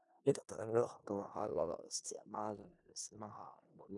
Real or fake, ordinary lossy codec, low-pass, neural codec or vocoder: fake; none; 10.8 kHz; codec, 16 kHz in and 24 kHz out, 0.4 kbps, LongCat-Audio-Codec, four codebook decoder